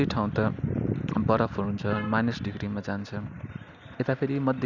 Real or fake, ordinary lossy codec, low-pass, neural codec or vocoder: real; none; 7.2 kHz; none